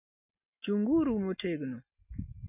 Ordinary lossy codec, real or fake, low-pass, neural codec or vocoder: none; real; 3.6 kHz; none